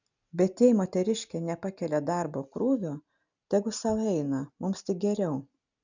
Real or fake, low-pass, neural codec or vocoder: real; 7.2 kHz; none